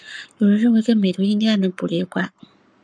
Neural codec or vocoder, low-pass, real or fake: codec, 44.1 kHz, 7.8 kbps, Pupu-Codec; 9.9 kHz; fake